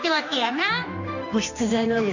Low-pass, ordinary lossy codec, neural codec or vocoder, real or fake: 7.2 kHz; none; codec, 16 kHz, 2 kbps, X-Codec, HuBERT features, trained on general audio; fake